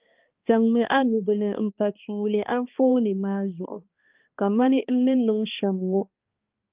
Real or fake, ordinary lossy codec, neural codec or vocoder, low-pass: fake; Opus, 24 kbps; codec, 16 kHz, 2 kbps, X-Codec, HuBERT features, trained on balanced general audio; 3.6 kHz